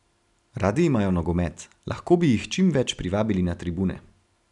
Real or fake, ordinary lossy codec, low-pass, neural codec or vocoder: real; none; 10.8 kHz; none